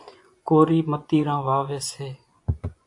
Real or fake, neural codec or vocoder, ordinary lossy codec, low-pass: fake; vocoder, 24 kHz, 100 mel bands, Vocos; AAC, 64 kbps; 10.8 kHz